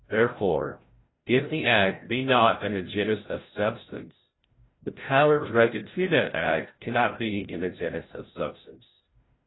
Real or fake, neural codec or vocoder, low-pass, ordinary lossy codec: fake; codec, 16 kHz, 0.5 kbps, FreqCodec, larger model; 7.2 kHz; AAC, 16 kbps